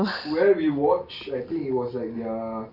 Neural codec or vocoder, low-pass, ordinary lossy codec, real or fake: none; 5.4 kHz; none; real